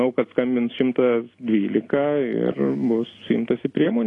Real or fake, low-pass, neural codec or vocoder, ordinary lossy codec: real; 10.8 kHz; none; AAC, 32 kbps